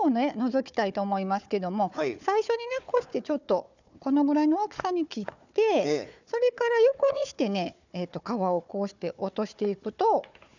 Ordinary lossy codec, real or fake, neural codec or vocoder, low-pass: none; fake; codec, 16 kHz, 4 kbps, FunCodec, trained on Chinese and English, 50 frames a second; 7.2 kHz